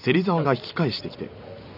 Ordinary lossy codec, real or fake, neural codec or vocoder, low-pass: none; fake; vocoder, 44.1 kHz, 128 mel bands every 256 samples, BigVGAN v2; 5.4 kHz